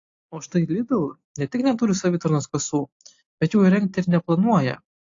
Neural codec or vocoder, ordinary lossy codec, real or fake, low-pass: none; AAC, 48 kbps; real; 7.2 kHz